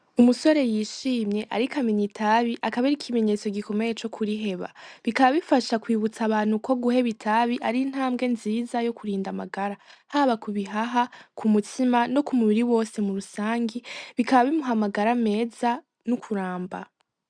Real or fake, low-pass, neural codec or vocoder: real; 9.9 kHz; none